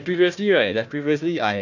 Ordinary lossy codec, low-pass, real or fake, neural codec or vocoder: none; 7.2 kHz; fake; codec, 16 kHz, 1 kbps, FunCodec, trained on Chinese and English, 50 frames a second